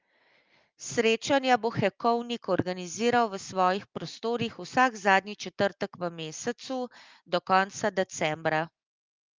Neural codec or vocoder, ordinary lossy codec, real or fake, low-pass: none; Opus, 32 kbps; real; 7.2 kHz